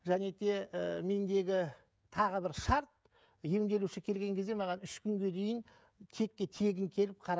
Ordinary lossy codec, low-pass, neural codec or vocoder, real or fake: none; none; codec, 16 kHz, 16 kbps, FreqCodec, smaller model; fake